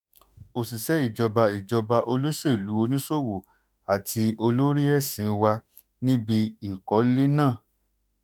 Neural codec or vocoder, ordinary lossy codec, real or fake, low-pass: autoencoder, 48 kHz, 32 numbers a frame, DAC-VAE, trained on Japanese speech; none; fake; none